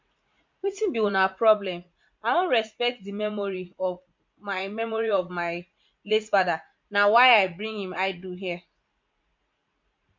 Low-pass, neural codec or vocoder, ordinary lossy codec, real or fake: 7.2 kHz; vocoder, 44.1 kHz, 80 mel bands, Vocos; MP3, 48 kbps; fake